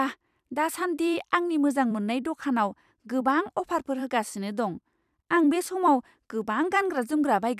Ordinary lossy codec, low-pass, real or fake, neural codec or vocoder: none; 14.4 kHz; fake; vocoder, 44.1 kHz, 128 mel bands every 256 samples, BigVGAN v2